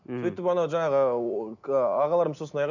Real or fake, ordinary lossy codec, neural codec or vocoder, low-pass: real; none; none; 7.2 kHz